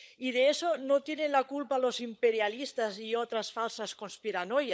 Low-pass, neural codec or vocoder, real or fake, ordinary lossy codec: none; codec, 16 kHz, 16 kbps, FunCodec, trained on LibriTTS, 50 frames a second; fake; none